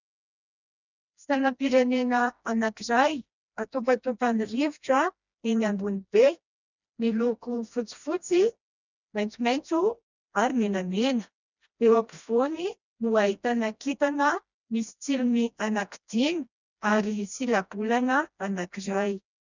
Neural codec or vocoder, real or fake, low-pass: codec, 16 kHz, 1 kbps, FreqCodec, smaller model; fake; 7.2 kHz